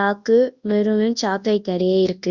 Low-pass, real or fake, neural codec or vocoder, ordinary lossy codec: 7.2 kHz; fake; codec, 24 kHz, 0.9 kbps, WavTokenizer, large speech release; Opus, 64 kbps